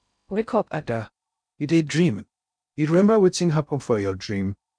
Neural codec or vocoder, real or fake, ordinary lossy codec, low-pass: codec, 16 kHz in and 24 kHz out, 0.6 kbps, FocalCodec, streaming, 2048 codes; fake; none; 9.9 kHz